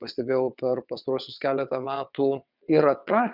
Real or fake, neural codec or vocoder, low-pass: fake; vocoder, 22.05 kHz, 80 mel bands, WaveNeXt; 5.4 kHz